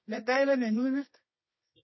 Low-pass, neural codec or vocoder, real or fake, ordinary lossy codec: 7.2 kHz; codec, 24 kHz, 0.9 kbps, WavTokenizer, medium music audio release; fake; MP3, 24 kbps